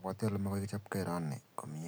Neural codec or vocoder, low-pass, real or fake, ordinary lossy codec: none; none; real; none